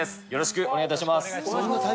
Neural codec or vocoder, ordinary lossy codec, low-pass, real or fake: none; none; none; real